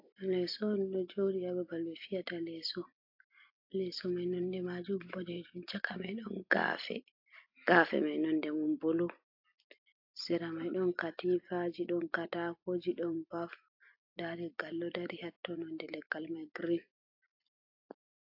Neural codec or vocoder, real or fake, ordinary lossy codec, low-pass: vocoder, 44.1 kHz, 128 mel bands every 256 samples, BigVGAN v2; fake; AAC, 48 kbps; 5.4 kHz